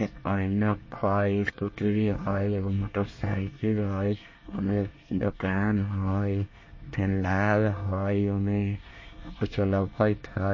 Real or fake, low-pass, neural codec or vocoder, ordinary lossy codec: fake; 7.2 kHz; codec, 24 kHz, 1 kbps, SNAC; MP3, 32 kbps